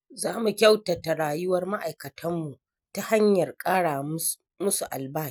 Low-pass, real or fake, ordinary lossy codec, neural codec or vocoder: none; real; none; none